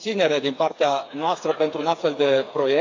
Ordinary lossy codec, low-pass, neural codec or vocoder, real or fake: AAC, 48 kbps; 7.2 kHz; codec, 16 kHz, 4 kbps, FreqCodec, smaller model; fake